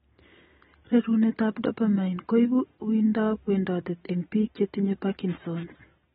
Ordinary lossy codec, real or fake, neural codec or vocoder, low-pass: AAC, 16 kbps; real; none; 10.8 kHz